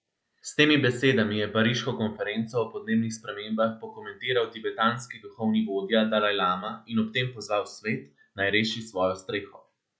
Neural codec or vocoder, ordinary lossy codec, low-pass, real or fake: none; none; none; real